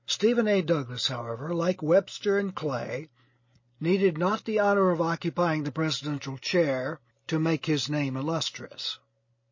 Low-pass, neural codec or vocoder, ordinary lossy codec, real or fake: 7.2 kHz; none; MP3, 32 kbps; real